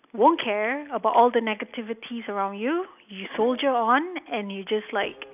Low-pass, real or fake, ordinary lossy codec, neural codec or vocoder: 3.6 kHz; real; none; none